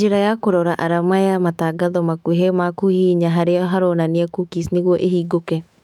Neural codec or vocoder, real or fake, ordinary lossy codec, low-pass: autoencoder, 48 kHz, 128 numbers a frame, DAC-VAE, trained on Japanese speech; fake; none; 19.8 kHz